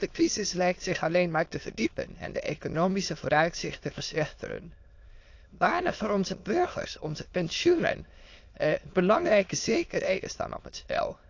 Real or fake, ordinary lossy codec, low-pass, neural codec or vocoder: fake; AAC, 48 kbps; 7.2 kHz; autoencoder, 22.05 kHz, a latent of 192 numbers a frame, VITS, trained on many speakers